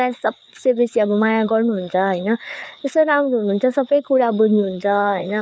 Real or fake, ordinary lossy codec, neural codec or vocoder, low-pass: fake; none; codec, 16 kHz, 4 kbps, FreqCodec, larger model; none